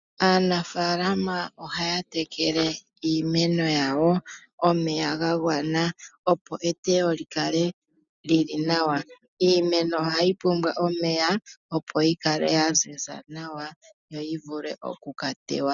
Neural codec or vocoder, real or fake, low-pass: none; real; 7.2 kHz